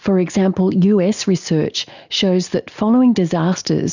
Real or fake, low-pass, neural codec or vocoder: real; 7.2 kHz; none